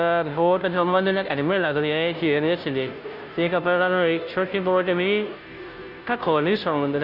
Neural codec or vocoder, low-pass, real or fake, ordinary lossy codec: codec, 16 kHz, 0.5 kbps, FunCodec, trained on Chinese and English, 25 frames a second; 5.4 kHz; fake; none